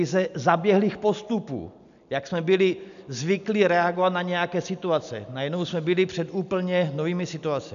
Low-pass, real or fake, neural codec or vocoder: 7.2 kHz; real; none